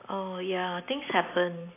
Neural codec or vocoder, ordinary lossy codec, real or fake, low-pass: none; AAC, 24 kbps; real; 3.6 kHz